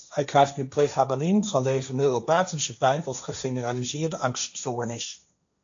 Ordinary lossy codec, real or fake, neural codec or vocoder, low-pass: AAC, 64 kbps; fake; codec, 16 kHz, 1.1 kbps, Voila-Tokenizer; 7.2 kHz